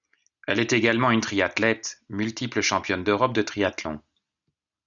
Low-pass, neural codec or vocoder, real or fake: 7.2 kHz; none; real